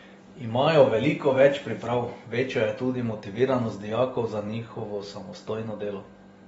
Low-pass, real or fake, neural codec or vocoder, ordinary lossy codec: 9.9 kHz; real; none; AAC, 24 kbps